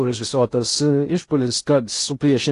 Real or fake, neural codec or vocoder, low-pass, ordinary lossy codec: fake; codec, 16 kHz in and 24 kHz out, 0.6 kbps, FocalCodec, streaming, 2048 codes; 10.8 kHz; AAC, 48 kbps